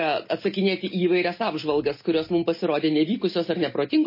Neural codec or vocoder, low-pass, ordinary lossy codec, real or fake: none; 5.4 kHz; MP3, 24 kbps; real